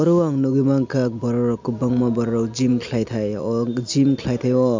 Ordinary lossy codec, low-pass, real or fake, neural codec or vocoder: MP3, 64 kbps; 7.2 kHz; real; none